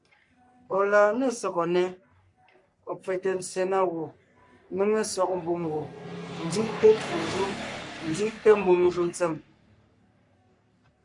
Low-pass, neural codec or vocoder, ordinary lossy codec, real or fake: 10.8 kHz; codec, 44.1 kHz, 3.4 kbps, Pupu-Codec; MP3, 64 kbps; fake